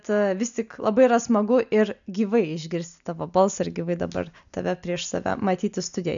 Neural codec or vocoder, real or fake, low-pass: none; real; 7.2 kHz